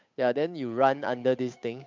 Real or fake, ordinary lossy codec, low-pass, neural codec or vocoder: fake; MP3, 64 kbps; 7.2 kHz; codec, 16 kHz, 8 kbps, FunCodec, trained on Chinese and English, 25 frames a second